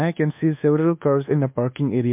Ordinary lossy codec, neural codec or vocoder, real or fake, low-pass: MP3, 32 kbps; codec, 24 kHz, 1.2 kbps, DualCodec; fake; 3.6 kHz